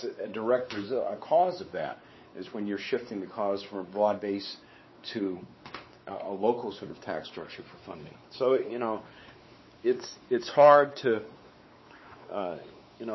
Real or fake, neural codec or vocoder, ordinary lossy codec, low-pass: fake; codec, 16 kHz, 4 kbps, X-Codec, WavLM features, trained on Multilingual LibriSpeech; MP3, 24 kbps; 7.2 kHz